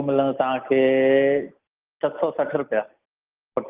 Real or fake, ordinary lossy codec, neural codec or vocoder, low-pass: real; Opus, 24 kbps; none; 3.6 kHz